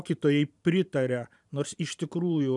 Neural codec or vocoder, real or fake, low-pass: vocoder, 44.1 kHz, 128 mel bands every 512 samples, BigVGAN v2; fake; 10.8 kHz